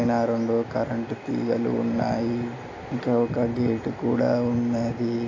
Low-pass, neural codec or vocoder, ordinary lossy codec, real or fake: 7.2 kHz; none; AAC, 32 kbps; real